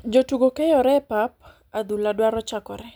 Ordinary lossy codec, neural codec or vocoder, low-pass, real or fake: none; none; none; real